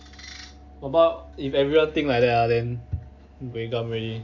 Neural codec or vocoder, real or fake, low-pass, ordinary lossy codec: none; real; 7.2 kHz; AAC, 48 kbps